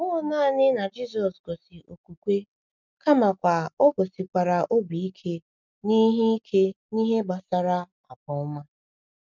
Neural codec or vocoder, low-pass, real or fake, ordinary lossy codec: none; 7.2 kHz; real; none